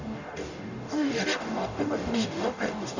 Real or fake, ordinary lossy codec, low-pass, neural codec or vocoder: fake; none; 7.2 kHz; codec, 44.1 kHz, 0.9 kbps, DAC